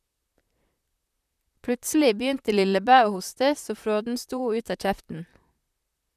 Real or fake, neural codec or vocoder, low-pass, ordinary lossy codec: fake; vocoder, 44.1 kHz, 128 mel bands, Pupu-Vocoder; 14.4 kHz; none